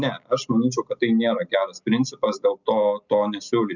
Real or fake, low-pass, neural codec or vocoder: real; 7.2 kHz; none